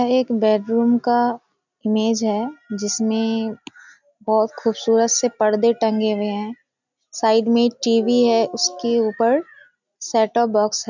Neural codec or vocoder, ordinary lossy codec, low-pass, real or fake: none; none; 7.2 kHz; real